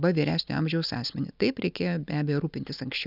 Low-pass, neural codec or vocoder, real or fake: 5.4 kHz; none; real